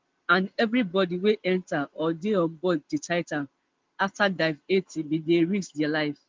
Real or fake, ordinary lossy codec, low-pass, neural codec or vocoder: real; Opus, 32 kbps; 7.2 kHz; none